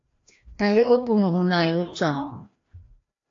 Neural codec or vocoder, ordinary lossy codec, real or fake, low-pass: codec, 16 kHz, 1 kbps, FreqCodec, larger model; AAC, 48 kbps; fake; 7.2 kHz